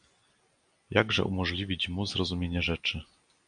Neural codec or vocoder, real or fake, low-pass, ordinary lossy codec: none; real; 9.9 kHz; MP3, 64 kbps